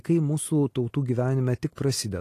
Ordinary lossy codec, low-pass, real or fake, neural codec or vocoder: AAC, 48 kbps; 14.4 kHz; real; none